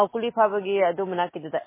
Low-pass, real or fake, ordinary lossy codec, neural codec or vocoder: 3.6 kHz; real; MP3, 16 kbps; none